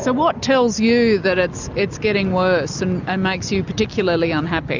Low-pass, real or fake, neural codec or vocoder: 7.2 kHz; real; none